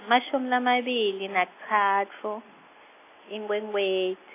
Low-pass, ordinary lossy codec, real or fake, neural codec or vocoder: 3.6 kHz; AAC, 24 kbps; real; none